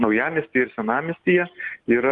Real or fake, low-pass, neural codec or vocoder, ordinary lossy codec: real; 10.8 kHz; none; Opus, 24 kbps